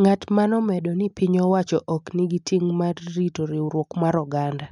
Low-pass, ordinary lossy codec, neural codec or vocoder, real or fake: 10.8 kHz; none; none; real